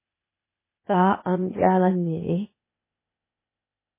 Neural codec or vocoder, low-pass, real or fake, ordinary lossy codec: codec, 16 kHz, 0.8 kbps, ZipCodec; 3.6 kHz; fake; MP3, 16 kbps